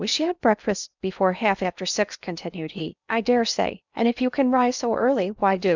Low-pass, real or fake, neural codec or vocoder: 7.2 kHz; fake; codec, 16 kHz in and 24 kHz out, 0.8 kbps, FocalCodec, streaming, 65536 codes